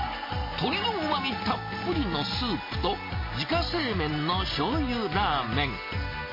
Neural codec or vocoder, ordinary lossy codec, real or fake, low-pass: none; MP3, 24 kbps; real; 5.4 kHz